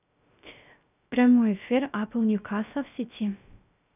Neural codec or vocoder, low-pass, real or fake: codec, 16 kHz, 0.3 kbps, FocalCodec; 3.6 kHz; fake